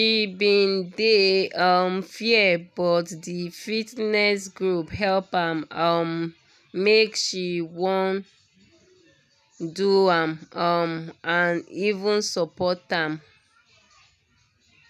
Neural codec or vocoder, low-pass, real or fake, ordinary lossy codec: none; 14.4 kHz; real; none